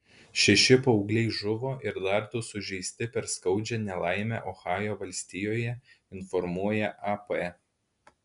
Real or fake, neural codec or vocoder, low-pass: real; none; 10.8 kHz